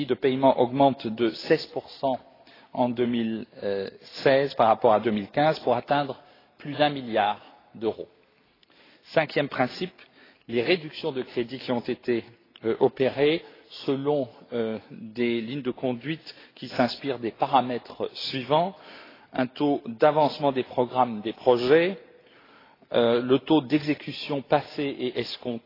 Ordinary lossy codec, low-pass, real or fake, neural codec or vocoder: AAC, 24 kbps; 5.4 kHz; real; none